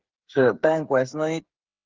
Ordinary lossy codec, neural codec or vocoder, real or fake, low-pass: Opus, 16 kbps; codec, 16 kHz, 8 kbps, FreqCodec, smaller model; fake; 7.2 kHz